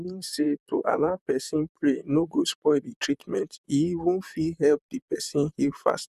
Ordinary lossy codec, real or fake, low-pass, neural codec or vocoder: none; fake; 14.4 kHz; vocoder, 44.1 kHz, 128 mel bands, Pupu-Vocoder